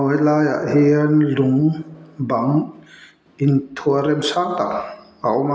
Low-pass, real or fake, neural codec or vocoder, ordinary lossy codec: none; real; none; none